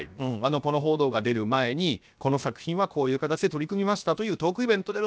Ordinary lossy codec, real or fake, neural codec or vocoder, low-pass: none; fake; codec, 16 kHz, 0.7 kbps, FocalCodec; none